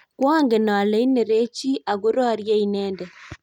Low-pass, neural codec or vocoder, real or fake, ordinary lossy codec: 19.8 kHz; none; real; none